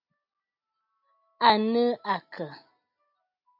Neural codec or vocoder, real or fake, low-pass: none; real; 5.4 kHz